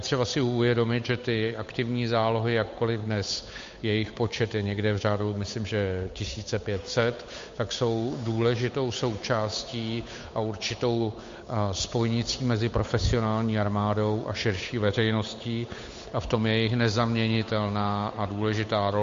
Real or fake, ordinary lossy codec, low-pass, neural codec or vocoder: fake; MP3, 48 kbps; 7.2 kHz; codec, 16 kHz, 8 kbps, FunCodec, trained on Chinese and English, 25 frames a second